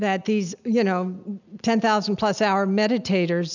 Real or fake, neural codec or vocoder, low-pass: real; none; 7.2 kHz